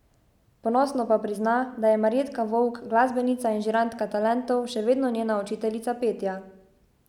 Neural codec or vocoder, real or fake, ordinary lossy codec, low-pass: none; real; none; 19.8 kHz